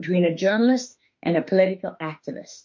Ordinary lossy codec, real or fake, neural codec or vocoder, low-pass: MP3, 48 kbps; fake; autoencoder, 48 kHz, 32 numbers a frame, DAC-VAE, trained on Japanese speech; 7.2 kHz